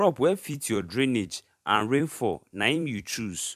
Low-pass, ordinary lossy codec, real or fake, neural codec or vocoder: 14.4 kHz; MP3, 96 kbps; fake; vocoder, 44.1 kHz, 128 mel bands every 256 samples, BigVGAN v2